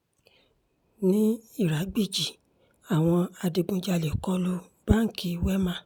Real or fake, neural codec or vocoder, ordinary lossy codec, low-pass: real; none; none; none